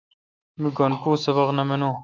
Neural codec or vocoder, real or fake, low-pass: autoencoder, 48 kHz, 128 numbers a frame, DAC-VAE, trained on Japanese speech; fake; 7.2 kHz